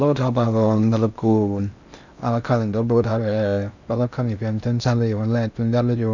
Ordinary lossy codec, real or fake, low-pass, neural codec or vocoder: none; fake; 7.2 kHz; codec, 16 kHz in and 24 kHz out, 0.6 kbps, FocalCodec, streaming, 4096 codes